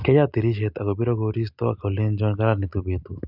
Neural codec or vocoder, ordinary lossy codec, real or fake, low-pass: none; none; real; 5.4 kHz